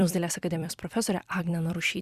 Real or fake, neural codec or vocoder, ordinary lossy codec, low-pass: fake; vocoder, 44.1 kHz, 128 mel bands every 256 samples, BigVGAN v2; Opus, 64 kbps; 14.4 kHz